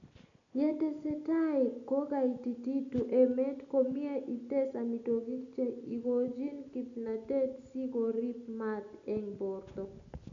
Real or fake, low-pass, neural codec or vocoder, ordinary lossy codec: real; 7.2 kHz; none; none